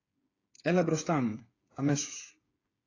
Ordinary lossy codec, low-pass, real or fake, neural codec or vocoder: AAC, 32 kbps; 7.2 kHz; fake; codec, 16 kHz, 8 kbps, FreqCodec, smaller model